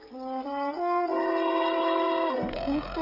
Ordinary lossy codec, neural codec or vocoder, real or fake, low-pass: Opus, 24 kbps; codec, 16 kHz, 16 kbps, FreqCodec, smaller model; fake; 5.4 kHz